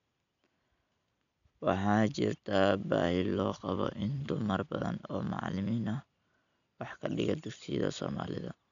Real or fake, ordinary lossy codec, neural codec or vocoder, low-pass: real; none; none; 7.2 kHz